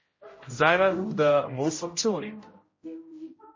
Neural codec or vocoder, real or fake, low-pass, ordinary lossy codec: codec, 16 kHz, 0.5 kbps, X-Codec, HuBERT features, trained on general audio; fake; 7.2 kHz; MP3, 32 kbps